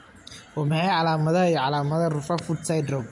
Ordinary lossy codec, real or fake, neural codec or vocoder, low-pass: MP3, 48 kbps; real; none; 10.8 kHz